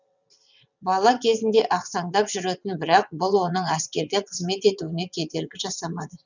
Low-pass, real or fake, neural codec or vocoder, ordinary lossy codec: 7.2 kHz; fake; vocoder, 22.05 kHz, 80 mel bands, WaveNeXt; none